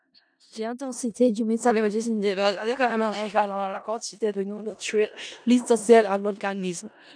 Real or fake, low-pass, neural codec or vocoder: fake; 9.9 kHz; codec, 16 kHz in and 24 kHz out, 0.4 kbps, LongCat-Audio-Codec, four codebook decoder